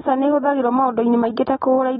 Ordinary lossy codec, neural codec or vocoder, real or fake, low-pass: AAC, 16 kbps; none; real; 9.9 kHz